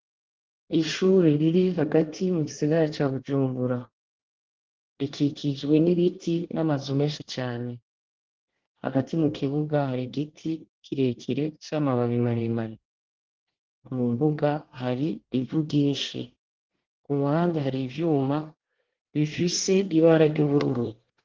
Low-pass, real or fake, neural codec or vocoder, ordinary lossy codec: 7.2 kHz; fake; codec, 24 kHz, 1 kbps, SNAC; Opus, 16 kbps